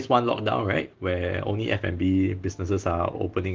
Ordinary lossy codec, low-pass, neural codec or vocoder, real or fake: Opus, 16 kbps; 7.2 kHz; none; real